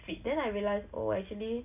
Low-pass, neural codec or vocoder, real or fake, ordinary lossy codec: 3.6 kHz; none; real; none